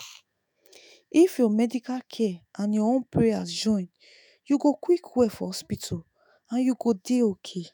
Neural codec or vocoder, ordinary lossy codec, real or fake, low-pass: autoencoder, 48 kHz, 128 numbers a frame, DAC-VAE, trained on Japanese speech; none; fake; none